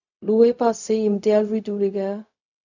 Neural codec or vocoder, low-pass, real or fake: codec, 16 kHz, 0.4 kbps, LongCat-Audio-Codec; 7.2 kHz; fake